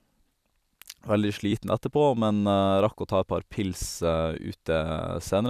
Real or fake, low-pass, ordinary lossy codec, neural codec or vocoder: fake; 14.4 kHz; none; vocoder, 44.1 kHz, 128 mel bands every 256 samples, BigVGAN v2